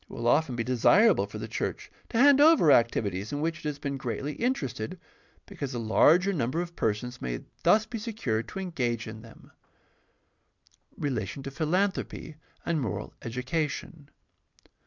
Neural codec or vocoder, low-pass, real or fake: none; 7.2 kHz; real